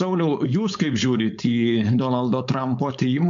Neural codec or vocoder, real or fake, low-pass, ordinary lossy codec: codec, 16 kHz, 4.8 kbps, FACodec; fake; 7.2 kHz; MP3, 64 kbps